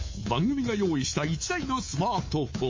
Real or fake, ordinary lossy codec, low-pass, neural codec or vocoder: fake; MP3, 32 kbps; 7.2 kHz; codec, 24 kHz, 3.1 kbps, DualCodec